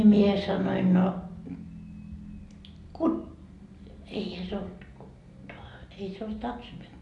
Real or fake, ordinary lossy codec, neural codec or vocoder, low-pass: real; AAC, 48 kbps; none; 10.8 kHz